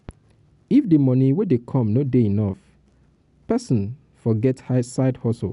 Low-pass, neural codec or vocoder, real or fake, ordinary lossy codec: 10.8 kHz; none; real; none